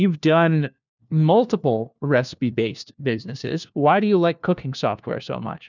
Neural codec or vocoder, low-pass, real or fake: codec, 16 kHz, 1 kbps, FunCodec, trained on LibriTTS, 50 frames a second; 7.2 kHz; fake